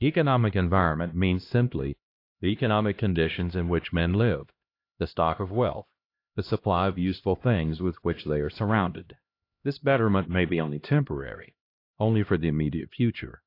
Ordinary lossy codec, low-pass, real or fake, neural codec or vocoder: AAC, 32 kbps; 5.4 kHz; fake; codec, 16 kHz, 1 kbps, X-Codec, HuBERT features, trained on LibriSpeech